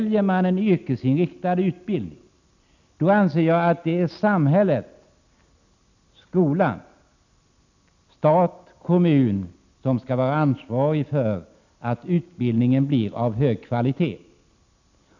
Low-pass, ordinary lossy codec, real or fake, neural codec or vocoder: 7.2 kHz; none; real; none